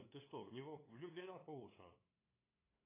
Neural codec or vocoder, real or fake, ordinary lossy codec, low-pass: codec, 16 kHz, 2 kbps, FunCodec, trained on LibriTTS, 25 frames a second; fake; AAC, 16 kbps; 3.6 kHz